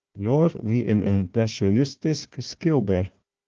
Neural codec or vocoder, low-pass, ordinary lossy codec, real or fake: codec, 16 kHz, 1 kbps, FunCodec, trained on Chinese and English, 50 frames a second; 7.2 kHz; Opus, 24 kbps; fake